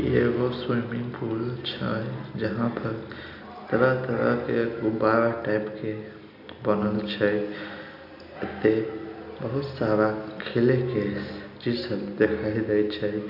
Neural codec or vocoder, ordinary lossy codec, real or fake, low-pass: none; none; real; 5.4 kHz